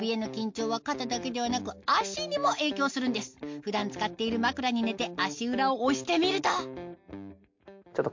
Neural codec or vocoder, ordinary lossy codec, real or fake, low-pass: none; MP3, 48 kbps; real; 7.2 kHz